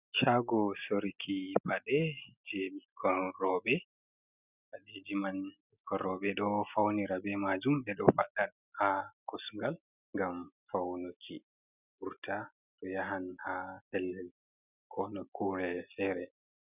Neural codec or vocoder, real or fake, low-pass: none; real; 3.6 kHz